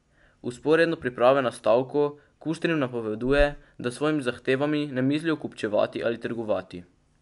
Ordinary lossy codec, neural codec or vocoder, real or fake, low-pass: none; none; real; 10.8 kHz